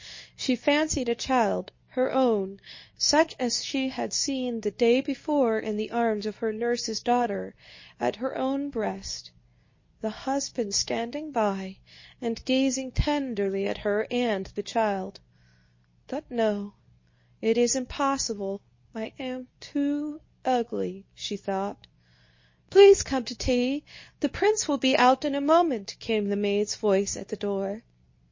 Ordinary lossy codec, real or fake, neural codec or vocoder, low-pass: MP3, 32 kbps; fake; codec, 24 kHz, 0.9 kbps, WavTokenizer, medium speech release version 2; 7.2 kHz